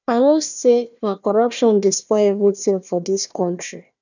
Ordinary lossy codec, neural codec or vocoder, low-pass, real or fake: none; codec, 16 kHz, 1 kbps, FunCodec, trained on Chinese and English, 50 frames a second; 7.2 kHz; fake